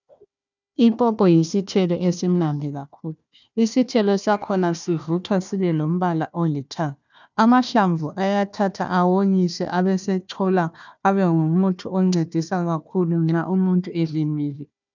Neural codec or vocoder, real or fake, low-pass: codec, 16 kHz, 1 kbps, FunCodec, trained on Chinese and English, 50 frames a second; fake; 7.2 kHz